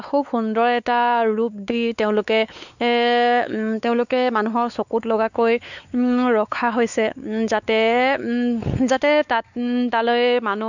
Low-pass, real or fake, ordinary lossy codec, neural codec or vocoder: 7.2 kHz; fake; none; codec, 16 kHz, 4 kbps, X-Codec, WavLM features, trained on Multilingual LibriSpeech